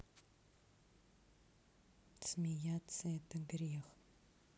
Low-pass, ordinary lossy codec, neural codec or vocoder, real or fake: none; none; none; real